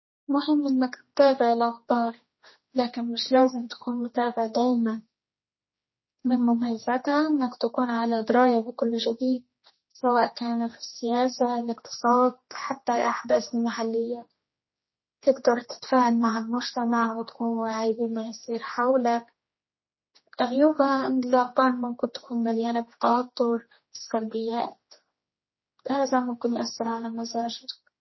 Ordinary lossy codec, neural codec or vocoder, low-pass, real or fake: MP3, 24 kbps; codec, 16 kHz, 2 kbps, X-Codec, HuBERT features, trained on general audio; 7.2 kHz; fake